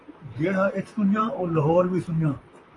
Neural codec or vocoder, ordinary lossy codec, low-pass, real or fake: vocoder, 44.1 kHz, 128 mel bands, Pupu-Vocoder; AAC, 32 kbps; 10.8 kHz; fake